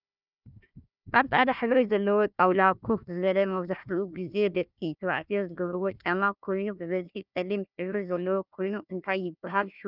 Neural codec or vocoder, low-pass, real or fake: codec, 16 kHz, 1 kbps, FunCodec, trained on Chinese and English, 50 frames a second; 5.4 kHz; fake